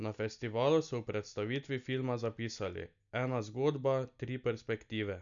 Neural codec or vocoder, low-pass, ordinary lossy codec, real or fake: none; 7.2 kHz; none; real